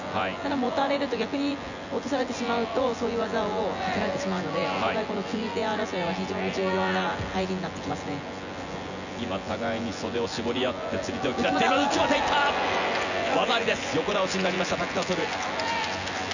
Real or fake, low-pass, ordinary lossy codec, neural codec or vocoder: fake; 7.2 kHz; none; vocoder, 24 kHz, 100 mel bands, Vocos